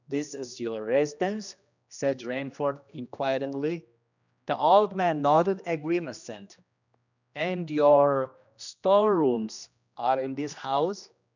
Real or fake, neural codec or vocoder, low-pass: fake; codec, 16 kHz, 1 kbps, X-Codec, HuBERT features, trained on general audio; 7.2 kHz